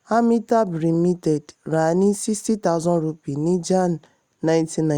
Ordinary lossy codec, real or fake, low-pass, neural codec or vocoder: Opus, 64 kbps; real; 19.8 kHz; none